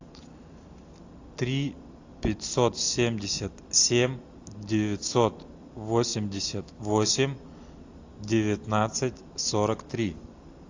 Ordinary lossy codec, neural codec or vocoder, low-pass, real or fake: AAC, 48 kbps; none; 7.2 kHz; real